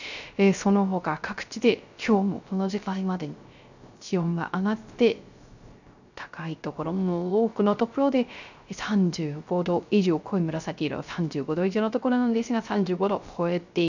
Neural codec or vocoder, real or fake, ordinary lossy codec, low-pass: codec, 16 kHz, 0.3 kbps, FocalCodec; fake; none; 7.2 kHz